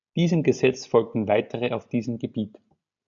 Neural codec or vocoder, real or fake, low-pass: none; real; 7.2 kHz